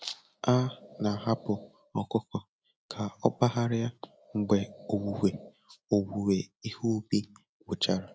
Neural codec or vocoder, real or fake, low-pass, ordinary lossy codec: none; real; none; none